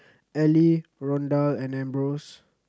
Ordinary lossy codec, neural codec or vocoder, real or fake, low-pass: none; none; real; none